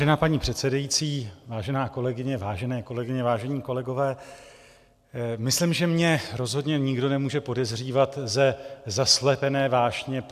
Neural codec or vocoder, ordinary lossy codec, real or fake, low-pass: none; AAC, 96 kbps; real; 14.4 kHz